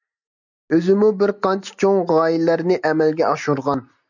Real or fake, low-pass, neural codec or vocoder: real; 7.2 kHz; none